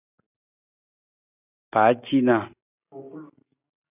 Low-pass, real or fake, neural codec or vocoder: 3.6 kHz; real; none